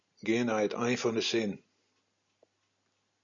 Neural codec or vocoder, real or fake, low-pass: none; real; 7.2 kHz